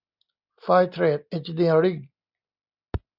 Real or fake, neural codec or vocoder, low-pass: real; none; 5.4 kHz